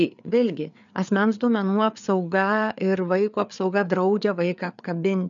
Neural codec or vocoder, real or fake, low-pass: codec, 16 kHz, 4 kbps, FreqCodec, larger model; fake; 7.2 kHz